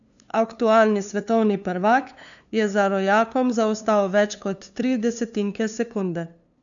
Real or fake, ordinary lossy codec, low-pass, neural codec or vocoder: fake; none; 7.2 kHz; codec, 16 kHz, 2 kbps, FunCodec, trained on LibriTTS, 25 frames a second